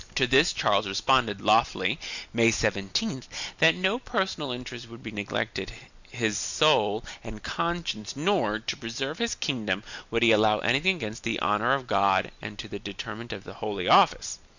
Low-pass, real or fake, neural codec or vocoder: 7.2 kHz; real; none